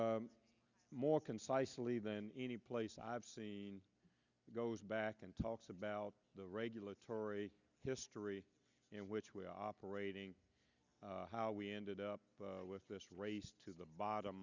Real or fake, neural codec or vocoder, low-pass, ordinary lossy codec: real; none; 7.2 kHz; Opus, 64 kbps